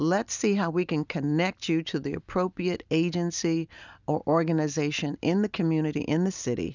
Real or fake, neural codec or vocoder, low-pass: real; none; 7.2 kHz